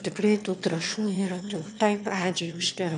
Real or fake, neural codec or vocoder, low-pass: fake; autoencoder, 22.05 kHz, a latent of 192 numbers a frame, VITS, trained on one speaker; 9.9 kHz